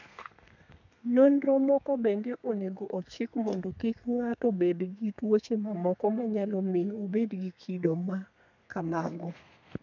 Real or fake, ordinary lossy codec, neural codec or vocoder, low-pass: fake; none; codec, 32 kHz, 1.9 kbps, SNAC; 7.2 kHz